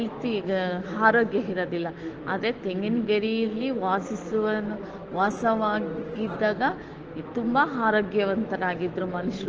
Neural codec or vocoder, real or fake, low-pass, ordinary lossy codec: autoencoder, 48 kHz, 128 numbers a frame, DAC-VAE, trained on Japanese speech; fake; 7.2 kHz; Opus, 16 kbps